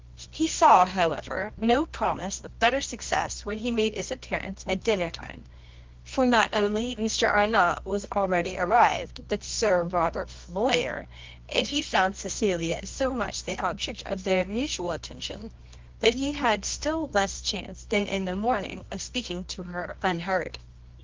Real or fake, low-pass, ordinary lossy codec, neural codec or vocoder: fake; 7.2 kHz; Opus, 32 kbps; codec, 24 kHz, 0.9 kbps, WavTokenizer, medium music audio release